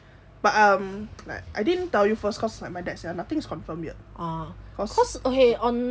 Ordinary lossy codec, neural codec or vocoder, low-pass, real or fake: none; none; none; real